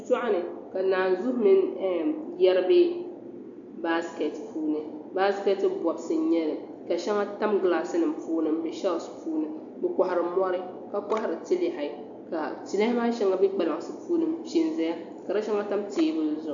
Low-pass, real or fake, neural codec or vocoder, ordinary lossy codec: 7.2 kHz; real; none; AAC, 64 kbps